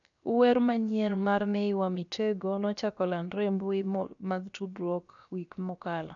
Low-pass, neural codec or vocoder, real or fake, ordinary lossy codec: 7.2 kHz; codec, 16 kHz, about 1 kbps, DyCAST, with the encoder's durations; fake; none